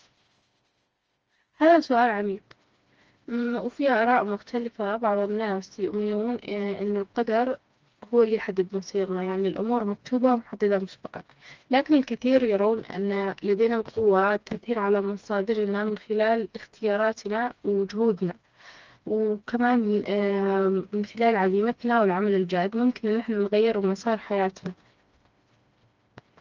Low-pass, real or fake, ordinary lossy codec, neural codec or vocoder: 7.2 kHz; fake; Opus, 24 kbps; codec, 16 kHz, 2 kbps, FreqCodec, smaller model